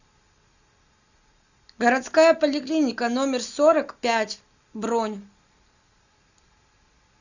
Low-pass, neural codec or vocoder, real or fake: 7.2 kHz; none; real